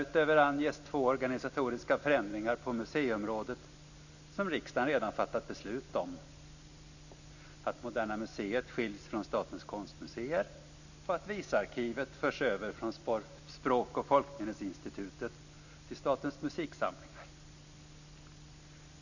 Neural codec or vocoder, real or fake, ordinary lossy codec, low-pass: none; real; none; 7.2 kHz